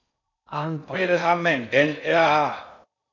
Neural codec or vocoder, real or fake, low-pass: codec, 16 kHz in and 24 kHz out, 0.6 kbps, FocalCodec, streaming, 4096 codes; fake; 7.2 kHz